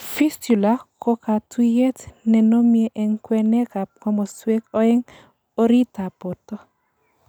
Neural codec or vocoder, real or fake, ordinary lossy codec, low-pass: none; real; none; none